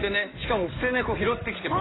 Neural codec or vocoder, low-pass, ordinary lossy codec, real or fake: codec, 16 kHz in and 24 kHz out, 2.2 kbps, FireRedTTS-2 codec; 7.2 kHz; AAC, 16 kbps; fake